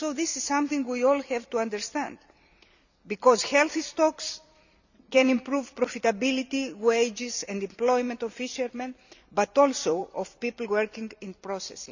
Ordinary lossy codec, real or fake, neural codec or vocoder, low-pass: none; fake; vocoder, 44.1 kHz, 128 mel bands every 256 samples, BigVGAN v2; 7.2 kHz